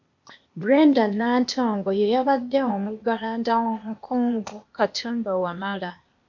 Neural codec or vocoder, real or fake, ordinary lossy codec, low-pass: codec, 16 kHz, 0.8 kbps, ZipCodec; fake; MP3, 48 kbps; 7.2 kHz